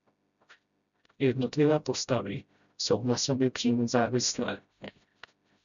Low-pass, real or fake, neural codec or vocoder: 7.2 kHz; fake; codec, 16 kHz, 0.5 kbps, FreqCodec, smaller model